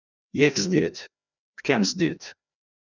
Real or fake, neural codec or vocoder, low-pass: fake; codec, 16 kHz, 1 kbps, FreqCodec, larger model; 7.2 kHz